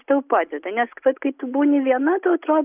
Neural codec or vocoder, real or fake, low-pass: none; real; 3.6 kHz